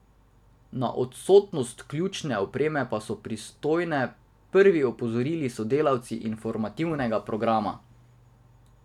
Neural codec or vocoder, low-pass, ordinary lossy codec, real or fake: none; 19.8 kHz; none; real